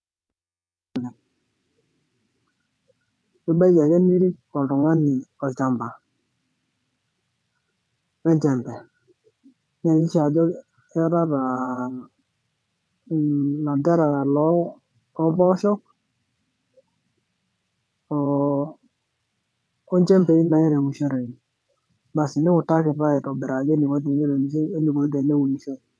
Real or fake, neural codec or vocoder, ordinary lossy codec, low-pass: fake; vocoder, 22.05 kHz, 80 mel bands, WaveNeXt; none; none